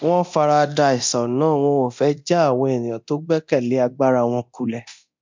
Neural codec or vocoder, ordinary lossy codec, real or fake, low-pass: codec, 24 kHz, 0.9 kbps, DualCodec; MP3, 64 kbps; fake; 7.2 kHz